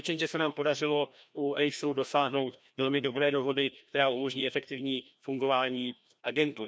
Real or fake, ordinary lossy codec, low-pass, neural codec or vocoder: fake; none; none; codec, 16 kHz, 1 kbps, FreqCodec, larger model